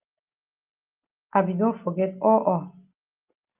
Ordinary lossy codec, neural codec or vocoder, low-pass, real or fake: Opus, 24 kbps; none; 3.6 kHz; real